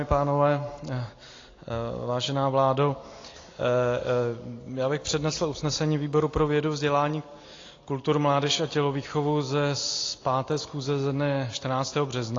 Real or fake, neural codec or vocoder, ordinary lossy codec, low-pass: real; none; AAC, 32 kbps; 7.2 kHz